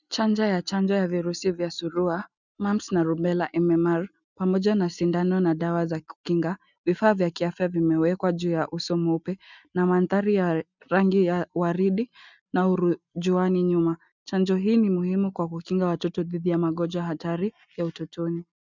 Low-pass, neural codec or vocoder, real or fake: 7.2 kHz; none; real